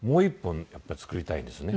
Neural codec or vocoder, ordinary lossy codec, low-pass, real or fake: none; none; none; real